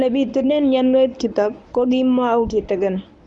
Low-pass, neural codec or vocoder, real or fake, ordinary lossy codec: none; codec, 24 kHz, 0.9 kbps, WavTokenizer, medium speech release version 1; fake; none